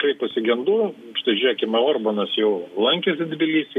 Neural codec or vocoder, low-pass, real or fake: none; 14.4 kHz; real